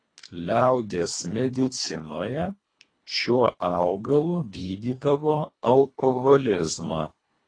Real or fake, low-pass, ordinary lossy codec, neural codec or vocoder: fake; 9.9 kHz; AAC, 32 kbps; codec, 24 kHz, 1.5 kbps, HILCodec